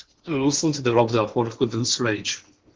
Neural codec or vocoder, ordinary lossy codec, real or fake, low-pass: codec, 16 kHz in and 24 kHz out, 0.8 kbps, FocalCodec, streaming, 65536 codes; Opus, 16 kbps; fake; 7.2 kHz